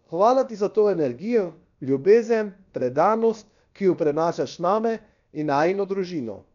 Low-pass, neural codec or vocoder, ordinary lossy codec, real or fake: 7.2 kHz; codec, 16 kHz, about 1 kbps, DyCAST, with the encoder's durations; none; fake